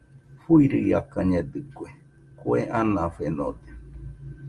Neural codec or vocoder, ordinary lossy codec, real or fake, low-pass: none; Opus, 32 kbps; real; 10.8 kHz